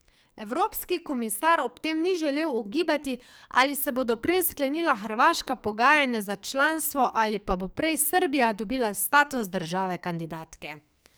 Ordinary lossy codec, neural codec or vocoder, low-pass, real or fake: none; codec, 44.1 kHz, 2.6 kbps, SNAC; none; fake